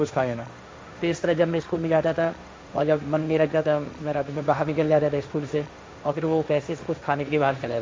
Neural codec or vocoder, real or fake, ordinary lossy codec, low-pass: codec, 16 kHz, 1.1 kbps, Voila-Tokenizer; fake; none; none